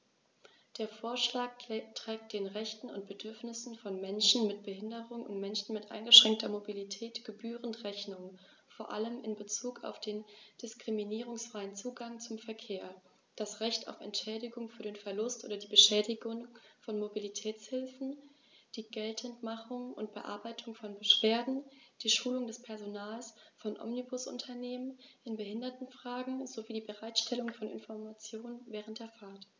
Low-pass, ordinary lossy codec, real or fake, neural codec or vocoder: none; none; real; none